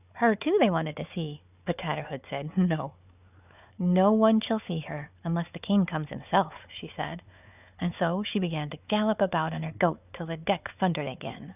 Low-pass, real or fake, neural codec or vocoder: 3.6 kHz; fake; codec, 16 kHz, 4 kbps, FunCodec, trained on Chinese and English, 50 frames a second